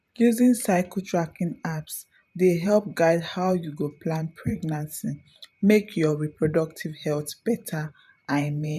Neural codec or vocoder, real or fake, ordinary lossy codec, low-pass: vocoder, 44.1 kHz, 128 mel bands every 512 samples, BigVGAN v2; fake; none; 14.4 kHz